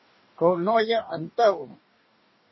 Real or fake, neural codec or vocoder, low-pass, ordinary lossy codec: fake; codec, 44.1 kHz, 2.6 kbps, DAC; 7.2 kHz; MP3, 24 kbps